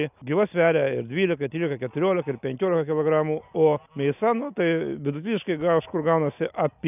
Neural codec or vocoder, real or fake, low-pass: none; real; 3.6 kHz